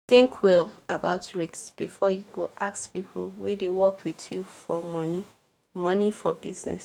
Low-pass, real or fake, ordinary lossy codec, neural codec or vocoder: 19.8 kHz; fake; none; codec, 44.1 kHz, 2.6 kbps, DAC